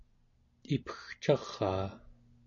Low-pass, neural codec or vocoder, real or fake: 7.2 kHz; none; real